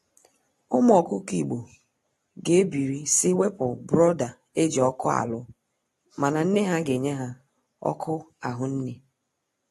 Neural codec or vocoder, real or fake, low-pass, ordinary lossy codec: none; real; 19.8 kHz; AAC, 32 kbps